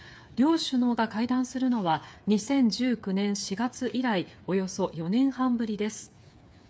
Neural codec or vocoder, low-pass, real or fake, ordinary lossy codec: codec, 16 kHz, 8 kbps, FreqCodec, smaller model; none; fake; none